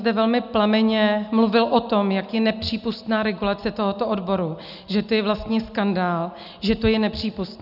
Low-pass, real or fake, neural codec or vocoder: 5.4 kHz; real; none